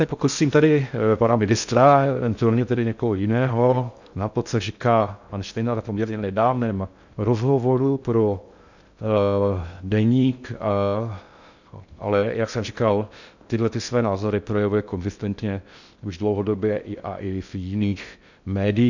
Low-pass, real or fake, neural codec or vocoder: 7.2 kHz; fake; codec, 16 kHz in and 24 kHz out, 0.6 kbps, FocalCodec, streaming, 2048 codes